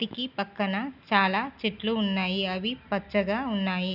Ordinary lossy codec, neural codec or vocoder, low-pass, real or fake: none; none; 5.4 kHz; real